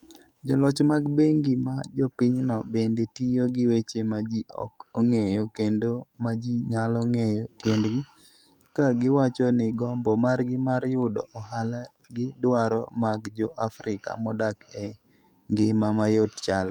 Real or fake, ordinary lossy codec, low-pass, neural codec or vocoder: fake; none; 19.8 kHz; codec, 44.1 kHz, 7.8 kbps, DAC